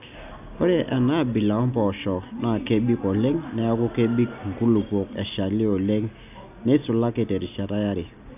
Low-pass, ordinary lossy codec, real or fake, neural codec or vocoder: 3.6 kHz; none; real; none